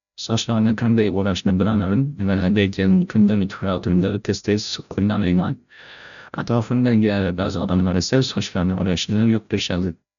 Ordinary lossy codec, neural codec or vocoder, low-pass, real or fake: none; codec, 16 kHz, 0.5 kbps, FreqCodec, larger model; 7.2 kHz; fake